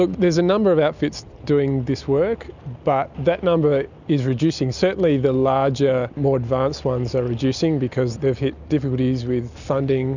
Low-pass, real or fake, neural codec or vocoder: 7.2 kHz; real; none